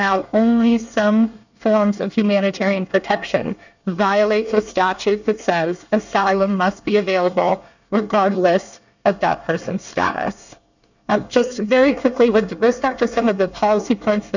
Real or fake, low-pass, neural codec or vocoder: fake; 7.2 kHz; codec, 24 kHz, 1 kbps, SNAC